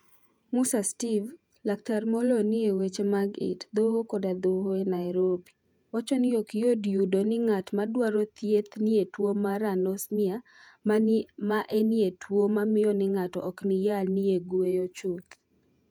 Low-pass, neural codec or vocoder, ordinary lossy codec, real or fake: 19.8 kHz; vocoder, 48 kHz, 128 mel bands, Vocos; none; fake